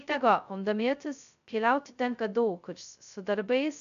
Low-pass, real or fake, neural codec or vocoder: 7.2 kHz; fake; codec, 16 kHz, 0.2 kbps, FocalCodec